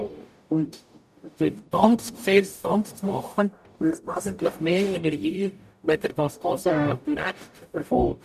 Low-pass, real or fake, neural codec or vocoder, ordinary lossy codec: 14.4 kHz; fake; codec, 44.1 kHz, 0.9 kbps, DAC; none